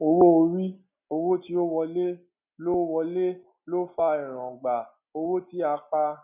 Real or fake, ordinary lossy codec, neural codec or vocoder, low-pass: real; none; none; 3.6 kHz